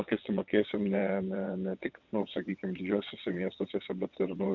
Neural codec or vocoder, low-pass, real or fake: vocoder, 22.05 kHz, 80 mel bands, WaveNeXt; 7.2 kHz; fake